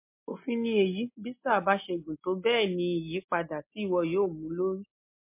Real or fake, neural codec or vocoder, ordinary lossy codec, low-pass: real; none; MP3, 24 kbps; 3.6 kHz